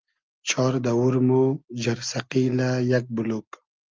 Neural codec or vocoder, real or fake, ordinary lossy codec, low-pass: none; real; Opus, 24 kbps; 7.2 kHz